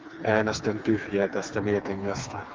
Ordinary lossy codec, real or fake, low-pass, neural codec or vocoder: Opus, 32 kbps; fake; 7.2 kHz; codec, 16 kHz, 4 kbps, FreqCodec, smaller model